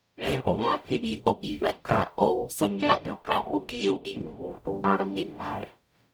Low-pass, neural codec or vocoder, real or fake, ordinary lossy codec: none; codec, 44.1 kHz, 0.9 kbps, DAC; fake; none